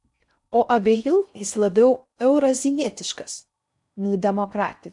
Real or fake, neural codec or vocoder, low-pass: fake; codec, 16 kHz in and 24 kHz out, 0.6 kbps, FocalCodec, streaming, 4096 codes; 10.8 kHz